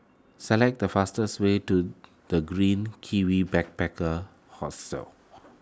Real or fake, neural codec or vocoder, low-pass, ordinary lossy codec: real; none; none; none